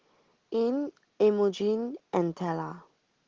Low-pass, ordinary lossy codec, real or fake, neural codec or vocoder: 7.2 kHz; Opus, 16 kbps; real; none